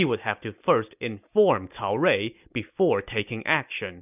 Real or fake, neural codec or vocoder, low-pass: real; none; 3.6 kHz